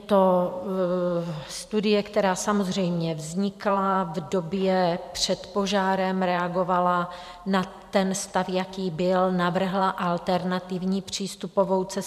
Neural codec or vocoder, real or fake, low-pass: vocoder, 44.1 kHz, 128 mel bands every 512 samples, BigVGAN v2; fake; 14.4 kHz